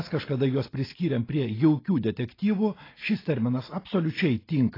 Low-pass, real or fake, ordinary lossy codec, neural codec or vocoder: 5.4 kHz; real; AAC, 24 kbps; none